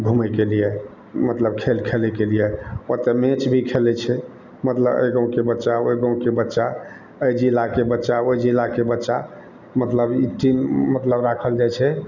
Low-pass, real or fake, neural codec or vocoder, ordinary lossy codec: 7.2 kHz; real; none; none